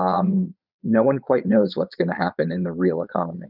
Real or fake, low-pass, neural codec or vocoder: fake; 5.4 kHz; vocoder, 22.05 kHz, 80 mel bands, Vocos